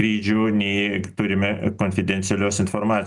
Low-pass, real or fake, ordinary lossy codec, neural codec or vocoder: 10.8 kHz; real; Opus, 64 kbps; none